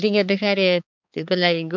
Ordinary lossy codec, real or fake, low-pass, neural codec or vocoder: none; fake; 7.2 kHz; codec, 16 kHz, 4 kbps, X-Codec, HuBERT features, trained on balanced general audio